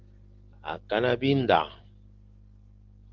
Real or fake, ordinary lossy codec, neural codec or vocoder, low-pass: real; Opus, 16 kbps; none; 7.2 kHz